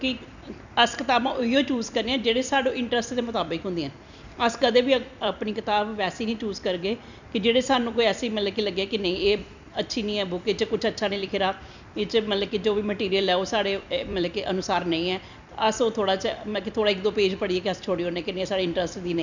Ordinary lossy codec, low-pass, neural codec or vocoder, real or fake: none; 7.2 kHz; vocoder, 44.1 kHz, 128 mel bands every 256 samples, BigVGAN v2; fake